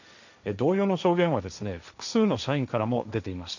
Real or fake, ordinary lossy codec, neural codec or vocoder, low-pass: fake; none; codec, 16 kHz, 1.1 kbps, Voila-Tokenizer; 7.2 kHz